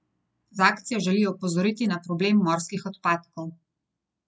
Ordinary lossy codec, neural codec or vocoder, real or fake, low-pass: none; none; real; none